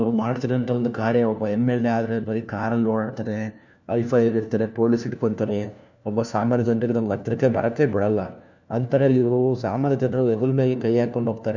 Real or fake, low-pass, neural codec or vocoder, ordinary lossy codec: fake; 7.2 kHz; codec, 16 kHz, 1 kbps, FunCodec, trained on LibriTTS, 50 frames a second; none